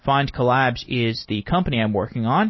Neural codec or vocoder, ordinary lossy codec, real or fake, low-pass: none; MP3, 24 kbps; real; 7.2 kHz